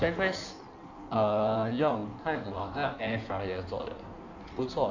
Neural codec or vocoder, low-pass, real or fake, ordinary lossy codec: codec, 16 kHz in and 24 kHz out, 1.1 kbps, FireRedTTS-2 codec; 7.2 kHz; fake; none